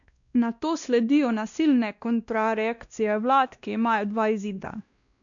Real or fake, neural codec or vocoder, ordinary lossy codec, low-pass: fake; codec, 16 kHz, 1 kbps, X-Codec, WavLM features, trained on Multilingual LibriSpeech; none; 7.2 kHz